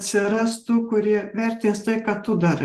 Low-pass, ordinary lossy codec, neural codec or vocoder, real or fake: 14.4 kHz; Opus, 16 kbps; none; real